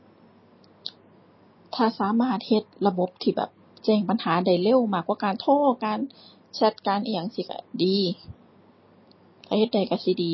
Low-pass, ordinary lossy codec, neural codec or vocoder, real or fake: 7.2 kHz; MP3, 24 kbps; none; real